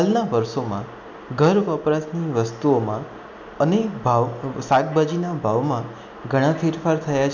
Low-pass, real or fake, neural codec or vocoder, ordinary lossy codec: 7.2 kHz; real; none; none